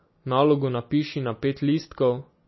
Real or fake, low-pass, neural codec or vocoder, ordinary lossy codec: real; 7.2 kHz; none; MP3, 24 kbps